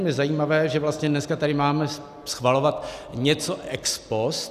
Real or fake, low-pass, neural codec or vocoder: real; 14.4 kHz; none